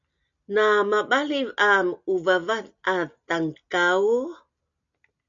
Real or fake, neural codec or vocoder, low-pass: real; none; 7.2 kHz